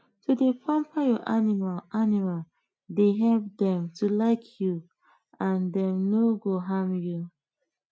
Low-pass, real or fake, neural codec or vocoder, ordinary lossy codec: none; real; none; none